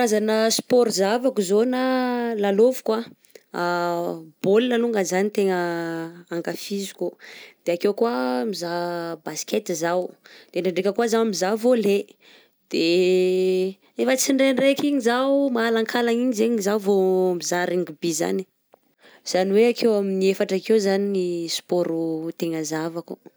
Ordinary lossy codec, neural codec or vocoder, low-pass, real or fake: none; none; none; real